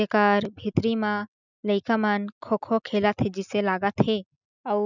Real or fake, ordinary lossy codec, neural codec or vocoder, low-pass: real; none; none; 7.2 kHz